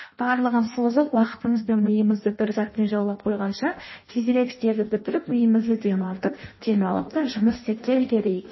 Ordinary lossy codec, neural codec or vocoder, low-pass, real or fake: MP3, 24 kbps; codec, 16 kHz in and 24 kHz out, 0.6 kbps, FireRedTTS-2 codec; 7.2 kHz; fake